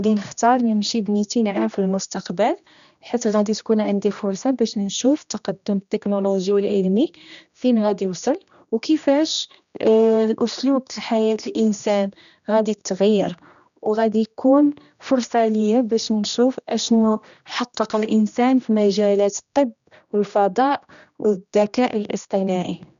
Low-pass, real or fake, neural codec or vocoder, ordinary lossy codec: 7.2 kHz; fake; codec, 16 kHz, 1 kbps, X-Codec, HuBERT features, trained on general audio; none